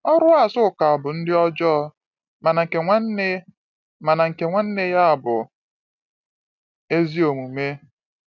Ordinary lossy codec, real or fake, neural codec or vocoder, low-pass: none; real; none; 7.2 kHz